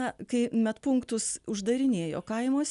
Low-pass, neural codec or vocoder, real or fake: 10.8 kHz; none; real